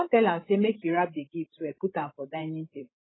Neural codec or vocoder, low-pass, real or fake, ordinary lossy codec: codec, 16 kHz, 16 kbps, FreqCodec, larger model; 7.2 kHz; fake; AAC, 16 kbps